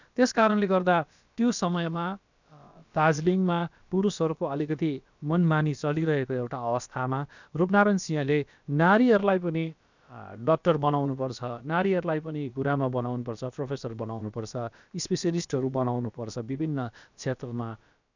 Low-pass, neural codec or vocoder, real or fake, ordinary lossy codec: 7.2 kHz; codec, 16 kHz, about 1 kbps, DyCAST, with the encoder's durations; fake; none